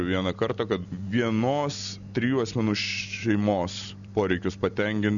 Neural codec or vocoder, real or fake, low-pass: none; real; 7.2 kHz